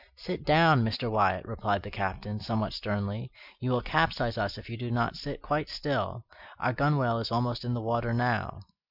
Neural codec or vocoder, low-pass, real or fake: none; 5.4 kHz; real